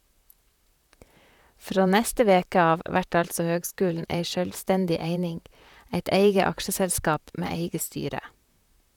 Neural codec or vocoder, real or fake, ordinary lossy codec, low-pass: vocoder, 44.1 kHz, 128 mel bands, Pupu-Vocoder; fake; none; 19.8 kHz